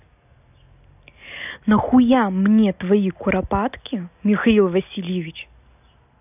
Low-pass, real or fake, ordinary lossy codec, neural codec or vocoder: 3.6 kHz; real; none; none